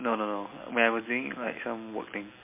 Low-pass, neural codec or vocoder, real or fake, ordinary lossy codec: 3.6 kHz; none; real; MP3, 24 kbps